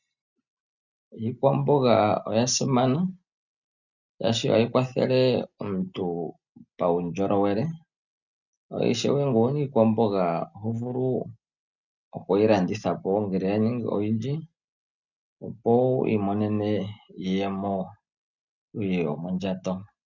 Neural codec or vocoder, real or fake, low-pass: none; real; 7.2 kHz